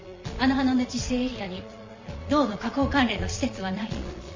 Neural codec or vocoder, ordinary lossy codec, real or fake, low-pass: vocoder, 22.05 kHz, 80 mel bands, Vocos; MP3, 32 kbps; fake; 7.2 kHz